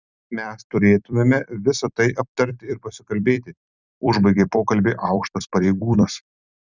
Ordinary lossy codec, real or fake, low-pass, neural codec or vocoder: Opus, 64 kbps; fake; 7.2 kHz; vocoder, 44.1 kHz, 128 mel bands every 256 samples, BigVGAN v2